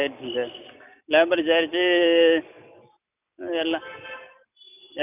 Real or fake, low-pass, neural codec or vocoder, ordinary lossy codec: real; 3.6 kHz; none; none